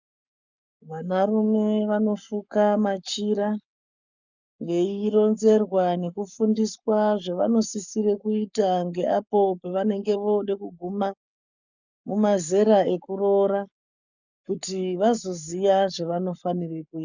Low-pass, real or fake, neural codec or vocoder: 7.2 kHz; fake; codec, 44.1 kHz, 7.8 kbps, Pupu-Codec